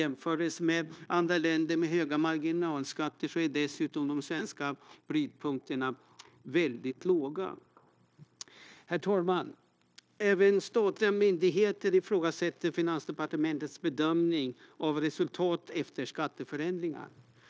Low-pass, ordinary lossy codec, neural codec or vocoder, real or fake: none; none; codec, 16 kHz, 0.9 kbps, LongCat-Audio-Codec; fake